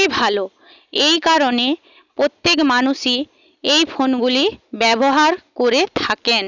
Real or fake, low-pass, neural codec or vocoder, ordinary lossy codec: real; 7.2 kHz; none; none